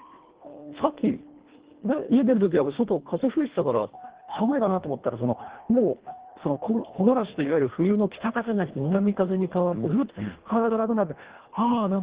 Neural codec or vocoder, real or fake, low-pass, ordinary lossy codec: codec, 24 kHz, 1.5 kbps, HILCodec; fake; 3.6 kHz; Opus, 16 kbps